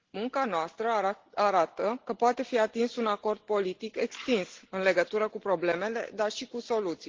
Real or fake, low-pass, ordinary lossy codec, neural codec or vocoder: real; 7.2 kHz; Opus, 16 kbps; none